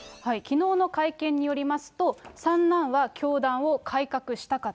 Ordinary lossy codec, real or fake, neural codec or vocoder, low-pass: none; real; none; none